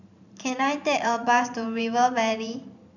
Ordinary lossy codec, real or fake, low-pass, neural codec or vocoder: none; fake; 7.2 kHz; vocoder, 44.1 kHz, 128 mel bands every 512 samples, BigVGAN v2